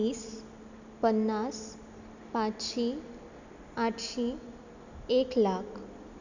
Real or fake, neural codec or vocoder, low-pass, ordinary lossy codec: real; none; 7.2 kHz; none